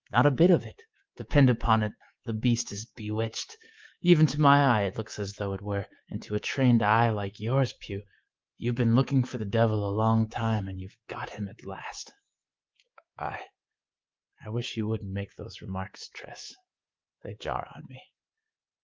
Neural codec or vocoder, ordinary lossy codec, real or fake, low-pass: codec, 24 kHz, 3.1 kbps, DualCodec; Opus, 32 kbps; fake; 7.2 kHz